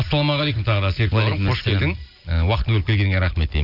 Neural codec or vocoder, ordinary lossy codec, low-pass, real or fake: none; none; 5.4 kHz; real